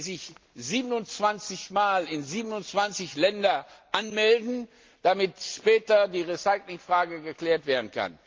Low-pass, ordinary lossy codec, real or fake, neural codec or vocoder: 7.2 kHz; Opus, 32 kbps; real; none